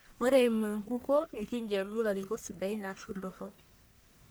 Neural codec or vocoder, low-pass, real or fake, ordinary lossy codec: codec, 44.1 kHz, 1.7 kbps, Pupu-Codec; none; fake; none